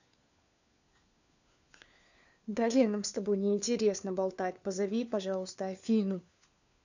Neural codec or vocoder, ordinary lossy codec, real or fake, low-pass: codec, 16 kHz, 4 kbps, FunCodec, trained on LibriTTS, 50 frames a second; none; fake; 7.2 kHz